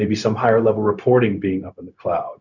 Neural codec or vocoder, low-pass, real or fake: codec, 16 kHz, 0.4 kbps, LongCat-Audio-Codec; 7.2 kHz; fake